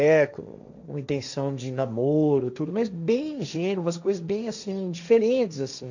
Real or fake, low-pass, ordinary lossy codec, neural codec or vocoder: fake; 7.2 kHz; none; codec, 16 kHz, 1.1 kbps, Voila-Tokenizer